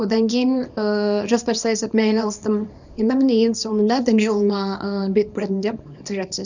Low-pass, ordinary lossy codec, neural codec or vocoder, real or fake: 7.2 kHz; none; codec, 24 kHz, 0.9 kbps, WavTokenizer, small release; fake